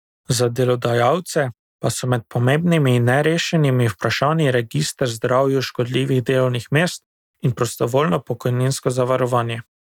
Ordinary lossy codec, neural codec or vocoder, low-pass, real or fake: none; none; 19.8 kHz; real